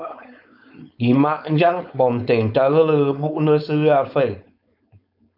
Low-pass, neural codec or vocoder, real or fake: 5.4 kHz; codec, 16 kHz, 4.8 kbps, FACodec; fake